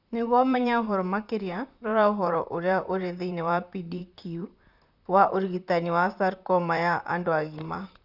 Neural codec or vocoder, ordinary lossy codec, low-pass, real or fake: vocoder, 44.1 kHz, 128 mel bands, Pupu-Vocoder; none; 5.4 kHz; fake